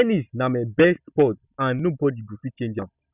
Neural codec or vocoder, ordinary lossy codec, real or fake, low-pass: none; none; real; 3.6 kHz